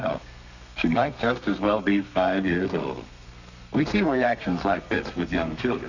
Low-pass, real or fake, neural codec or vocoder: 7.2 kHz; fake; codec, 32 kHz, 1.9 kbps, SNAC